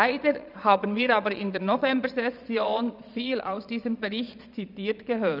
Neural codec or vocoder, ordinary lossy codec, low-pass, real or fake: vocoder, 22.05 kHz, 80 mel bands, Vocos; none; 5.4 kHz; fake